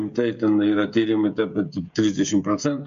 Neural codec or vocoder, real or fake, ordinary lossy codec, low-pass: codec, 16 kHz, 16 kbps, FreqCodec, smaller model; fake; MP3, 48 kbps; 7.2 kHz